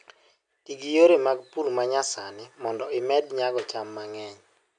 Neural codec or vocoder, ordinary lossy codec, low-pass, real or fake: none; none; 9.9 kHz; real